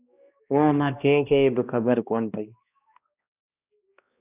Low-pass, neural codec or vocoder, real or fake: 3.6 kHz; codec, 16 kHz, 1 kbps, X-Codec, HuBERT features, trained on balanced general audio; fake